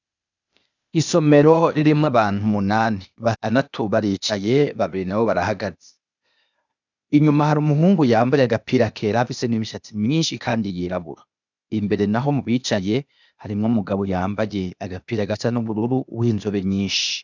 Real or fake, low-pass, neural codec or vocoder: fake; 7.2 kHz; codec, 16 kHz, 0.8 kbps, ZipCodec